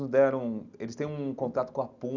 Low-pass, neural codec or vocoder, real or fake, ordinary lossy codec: 7.2 kHz; none; real; Opus, 64 kbps